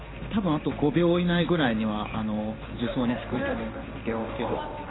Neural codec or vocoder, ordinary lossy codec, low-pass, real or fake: codec, 16 kHz in and 24 kHz out, 1 kbps, XY-Tokenizer; AAC, 16 kbps; 7.2 kHz; fake